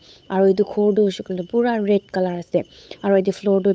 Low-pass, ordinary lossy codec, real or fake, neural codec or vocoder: none; none; fake; codec, 16 kHz, 8 kbps, FunCodec, trained on Chinese and English, 25 frames a second